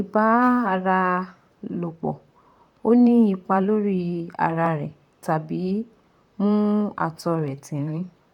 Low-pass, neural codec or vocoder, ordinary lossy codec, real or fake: 19.8 kHz; vocoder, 44.1 kHz, 128 mel bands, Pupu-Vocoder; none; fake